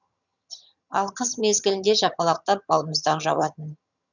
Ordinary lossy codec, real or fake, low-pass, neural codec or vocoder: none; fake; 7.2 kHz; vocoder, 22.05 kHz, 80 mel bands, HiFi-GAN